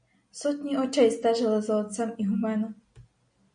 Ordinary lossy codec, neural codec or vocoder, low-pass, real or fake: MP3, 64 kbps; none; 9.9 kHz; real